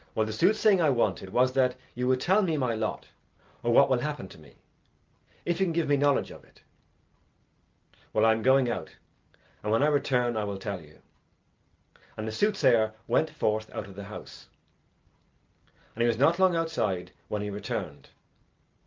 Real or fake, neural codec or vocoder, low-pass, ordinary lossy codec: real; none; 7.2 kHz; Opus, 24 kbps